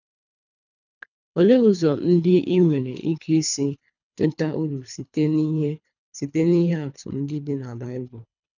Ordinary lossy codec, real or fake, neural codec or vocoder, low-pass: none; fake; codec, 24 kHz, 3 kbps, HILCodec; 7.2 kHz